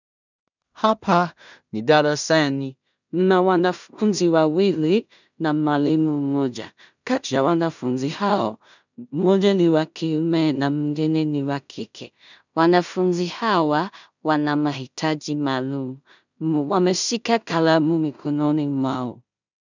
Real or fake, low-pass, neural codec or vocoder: fake; 7.2 kHz; codec, 16 kHz in and 24 kHz out, 0.4 kbps, LongCat-Audio-Codec, two codebook decoder